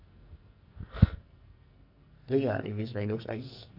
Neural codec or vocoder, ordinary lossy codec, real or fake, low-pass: codec, 44.1 kHz, 2.6 kbps, SNAC; none; fake; 5.4 kHz